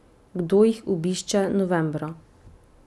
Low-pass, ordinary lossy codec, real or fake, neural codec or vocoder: none; none; real; none